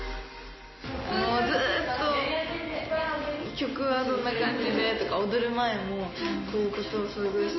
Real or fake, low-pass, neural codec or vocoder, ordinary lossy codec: real; 7.2 kHz; none; MP3, 24 kbps